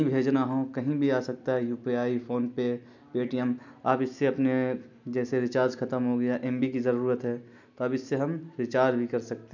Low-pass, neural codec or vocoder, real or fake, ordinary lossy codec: 7.2 kHz; none; real; none